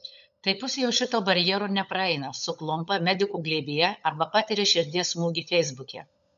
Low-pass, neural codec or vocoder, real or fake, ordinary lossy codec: 7.2 kHz; codec, 16 kHz, 16 kbps, FunCodec, trained on LibriTTS, 50 frames a second; fake; MP3, 96 kbps